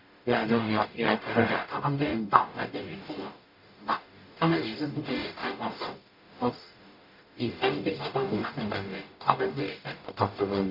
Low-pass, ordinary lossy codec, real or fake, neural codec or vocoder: 5.4 kHz; none; fake; codec, 44.1 kHz, 0.9 kbps, DAC